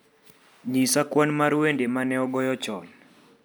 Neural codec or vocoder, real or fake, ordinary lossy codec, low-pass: none; real; none; none